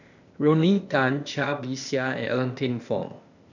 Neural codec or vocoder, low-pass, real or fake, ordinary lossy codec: codec, 16 kHz, 0.8 kbps, ZipCodec; 7.2 kHz; fake; none